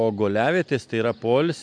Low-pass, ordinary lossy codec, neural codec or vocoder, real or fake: 9.9 kHz; MP3, 64 kbps; none; real